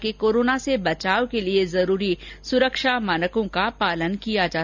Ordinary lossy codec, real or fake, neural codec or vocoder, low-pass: none; real; none; 7.2 kHz